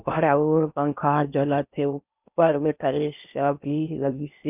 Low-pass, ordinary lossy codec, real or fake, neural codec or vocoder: 3.6 kHz; none; fake; codec, 16 kHz in and 24 kHz out, 0.6 kbps, FocalCodec, streaming, 4096 codes